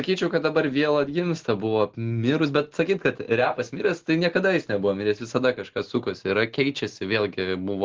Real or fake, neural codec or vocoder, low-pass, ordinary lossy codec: real; none; 7.2 kHz; Opus, 16 kbps